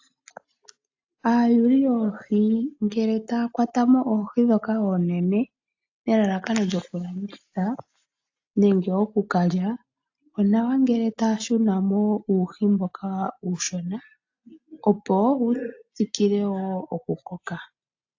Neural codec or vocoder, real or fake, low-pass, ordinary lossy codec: none; real; 7.2 kHz; AAC, 48 kbps